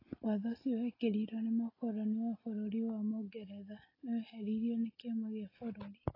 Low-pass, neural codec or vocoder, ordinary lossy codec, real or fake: 5.4 kHz; none; AAC, 24 kbps; real